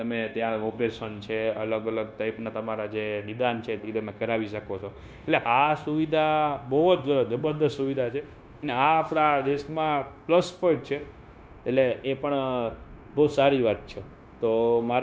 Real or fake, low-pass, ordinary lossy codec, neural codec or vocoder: fake; none; none; codec, 16 kHz, 0.9 kbps, LongCat-Audio-Codec